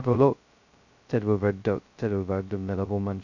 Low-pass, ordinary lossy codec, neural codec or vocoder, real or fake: 7.2 kHz; none; codec, 16 kHz, 0.2 kbps, FocalCodec; fake